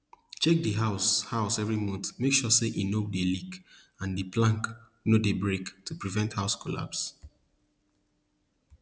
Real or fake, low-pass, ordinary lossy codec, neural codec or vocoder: real; none; none; none